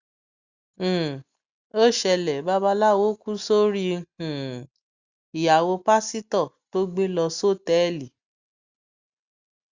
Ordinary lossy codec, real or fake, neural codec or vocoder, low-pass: Opus, 64 kbps; real; none; 7.2 kHz